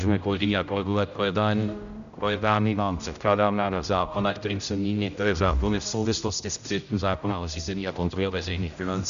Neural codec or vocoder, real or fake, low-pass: codec, 16 kHz, 0.5 kbps, X-Codec, HuBERT features, trained on general audio; fake; 7.2 kHz